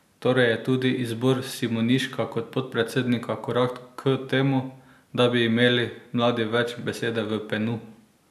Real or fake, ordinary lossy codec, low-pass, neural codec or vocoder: real; none; 14.4 kHz; none